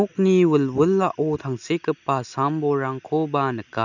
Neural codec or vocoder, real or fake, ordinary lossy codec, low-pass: none; real; none; 7.2 kHz